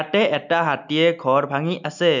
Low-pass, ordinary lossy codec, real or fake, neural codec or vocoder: 7.2 kHz; none; real; none